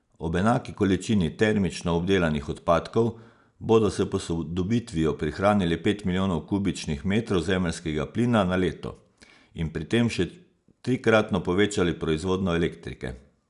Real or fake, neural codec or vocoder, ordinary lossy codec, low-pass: real; none; none; 10.8 kHz